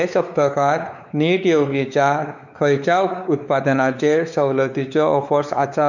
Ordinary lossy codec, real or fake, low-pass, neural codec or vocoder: none; fake; 7.2 kHz; codec, 16 kHz, 4 kbps, X-Codec, WavLM features, trained on Multilingual LibriSpeech